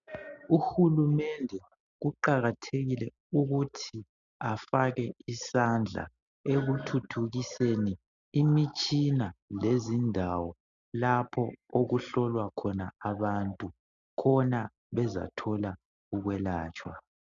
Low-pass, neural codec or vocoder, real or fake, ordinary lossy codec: 7.2 kHz; none; real; MP3, 96 kbps